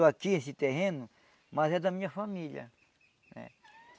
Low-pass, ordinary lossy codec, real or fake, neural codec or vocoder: none; none; real; none